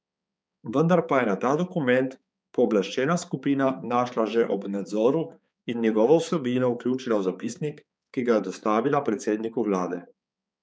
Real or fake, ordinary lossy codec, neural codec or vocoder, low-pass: fake; none; codec, 16 kHz, 4 kbps, X-Codec, HuBERT features, trained on balanced general audio; none